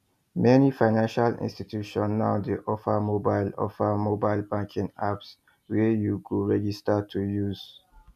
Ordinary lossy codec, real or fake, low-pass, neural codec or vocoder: none; real; 14.4 kHz; none